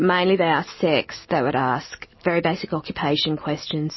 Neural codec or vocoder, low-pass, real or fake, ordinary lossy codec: none; 7.2 kHz; real; MP3, 24 kbps